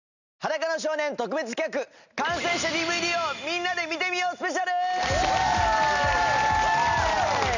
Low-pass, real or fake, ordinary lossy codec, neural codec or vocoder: 7.2 kHz; real; none; none